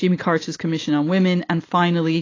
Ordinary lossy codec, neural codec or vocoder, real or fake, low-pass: AAC, 32 kbps; none; real; 7.2 kHz